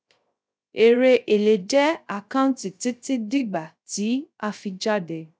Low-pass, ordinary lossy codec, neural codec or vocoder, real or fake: none; none; codec, 16 kHz, 0.3 kbps, FocalCodec; fake